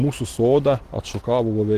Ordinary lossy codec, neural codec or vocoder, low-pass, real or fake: Opus, 16 kbps; none; 14.4 kHz; real